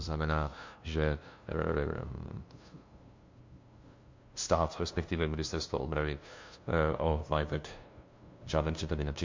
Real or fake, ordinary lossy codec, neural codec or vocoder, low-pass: fake; MP3, 48 kbps; codec, 16 kHz, 0.5 kbps, FunCodec, trained on LibriTTS, 25 frames a second; 7.2 kHz